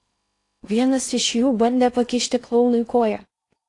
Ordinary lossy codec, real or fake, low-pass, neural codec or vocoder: AAC, 48 kbps; fake; 10.8 kHz; codec, 16 kHz in and 24 kHz out, 0.6 kbps, FocalCodec, streaming, 2048 codes